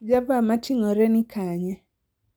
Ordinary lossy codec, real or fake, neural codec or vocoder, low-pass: none; real; none; none